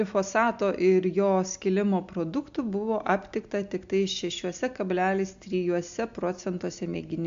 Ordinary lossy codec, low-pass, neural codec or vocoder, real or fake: AAC, 64 kbps; 7.2 kHz; none; real